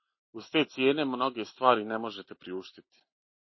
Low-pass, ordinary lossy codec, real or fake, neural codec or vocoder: 7.2 kHz; MP3, 24 kbps; real; none